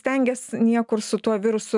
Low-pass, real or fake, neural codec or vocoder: 10.8 kHz; real; none